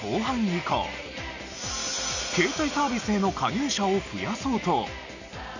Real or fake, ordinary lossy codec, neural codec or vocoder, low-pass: real; none; none; 7.2 kHz